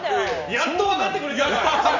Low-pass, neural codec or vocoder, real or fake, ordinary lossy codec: 7.2 kHz; none; real; none